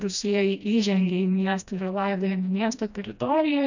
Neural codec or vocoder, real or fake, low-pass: codec, 16 kHz, 1 kbps, FreqCodec, smaller model; fake; 7.2 kHz